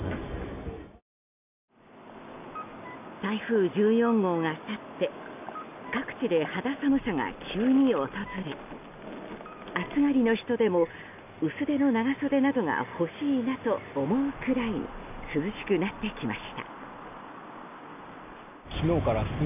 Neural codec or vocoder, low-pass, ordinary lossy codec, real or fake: none; 3.6 kHz; none; real